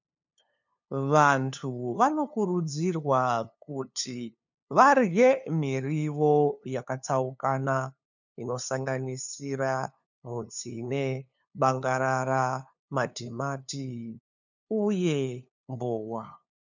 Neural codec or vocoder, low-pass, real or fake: codec, 16 kHz, 2 kbps, FunCodec, trained on LibriTTS, 25 frames a second; 7.2 kHz; fake